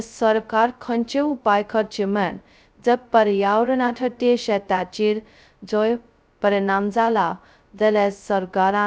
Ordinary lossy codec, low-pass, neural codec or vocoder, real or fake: none; none; codec, 16 kHz, 0.2 kbps, FocalCodec; fake